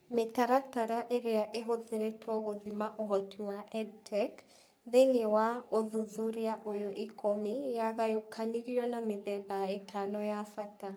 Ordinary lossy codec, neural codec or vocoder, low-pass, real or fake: none; codec, 44.1 kHz, 3.4 kbps, Pupu-Codec; none; fake